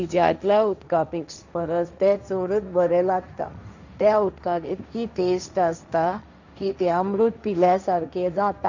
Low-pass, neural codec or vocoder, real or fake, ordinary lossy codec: 7.2 kHz; codec, 16 kHz, 1.1 kbps, Voila-Tokenizer; fake; none